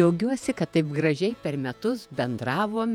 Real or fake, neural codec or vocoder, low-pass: fake; vocoder, 48 kHz, 128 mel bands, Vocos; 19.8 kHz